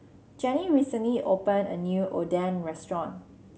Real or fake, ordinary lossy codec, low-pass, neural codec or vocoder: real; none; none; none